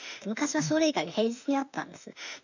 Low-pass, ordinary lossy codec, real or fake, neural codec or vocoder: 7.2 kHz; none; fake; autoencoder, 48 kHz, 32 numbers a frame, DAC-VAE, trained on Japanese speech